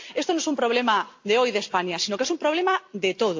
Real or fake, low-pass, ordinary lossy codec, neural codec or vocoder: real; 7.2 kHz; AAC, 48 kbps; none